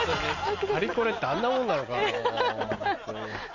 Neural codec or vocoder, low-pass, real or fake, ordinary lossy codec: none; 7.2 kHz; real; none